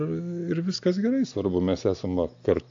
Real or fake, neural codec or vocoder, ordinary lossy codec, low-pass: real; none; AAC, 48 kbps; 7.2 kHz